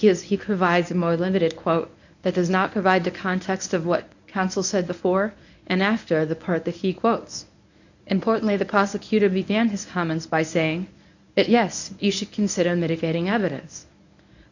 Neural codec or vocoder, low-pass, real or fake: codec, 24 kHz, 0.9 kbps, WavTokenizer, medium speech release version 1; 7.2 kHz; fake